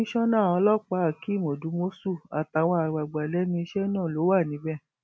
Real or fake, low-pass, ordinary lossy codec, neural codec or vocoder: real; none; none; none